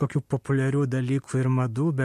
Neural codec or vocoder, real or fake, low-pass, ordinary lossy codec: none; real; 14.4 kHz; MP3, 64 kbps